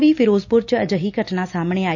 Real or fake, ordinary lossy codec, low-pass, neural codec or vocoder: real; none; 7.2 kHz; none